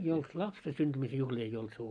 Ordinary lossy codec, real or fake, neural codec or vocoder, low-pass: none; real; none; 9.9 kHz